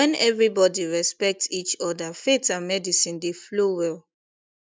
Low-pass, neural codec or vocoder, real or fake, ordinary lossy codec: none; none; real; none